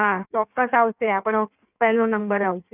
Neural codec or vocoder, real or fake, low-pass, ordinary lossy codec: codec, 16 kHz in and 24 kHz out, 1.1 kbps, FireRedTTS-2 codec; fake; 3.6 kHz; none